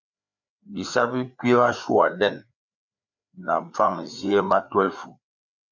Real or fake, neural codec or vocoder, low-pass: fake; codec, 16 kHz, 4 kbps, FreqCodec, larger model; 7.2 kHz